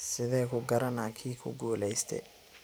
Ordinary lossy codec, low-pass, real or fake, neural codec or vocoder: none; none; fake; vocoder, 44.1 kHz, 128 mel bands every 512 samples, BigVGAN v2